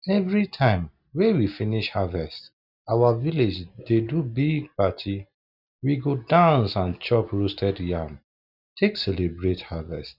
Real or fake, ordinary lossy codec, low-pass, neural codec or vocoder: real; none; 5.4 kHz; none